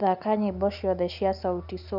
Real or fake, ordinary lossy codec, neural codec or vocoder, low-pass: real; none; none; 5.4 kHz